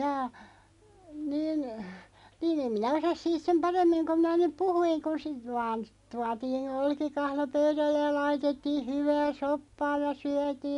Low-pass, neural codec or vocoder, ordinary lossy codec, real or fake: 10.8 kHz; none; none; real